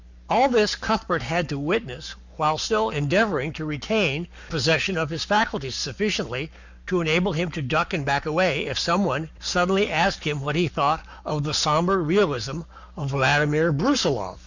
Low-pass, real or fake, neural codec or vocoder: 7.2 kHz; fake; codec, 44.1 kHz, 7.8 kbps, Pupu-Codec